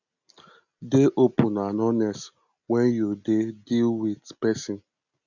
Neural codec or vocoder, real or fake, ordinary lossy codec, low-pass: none; real; none; 7.2 kHz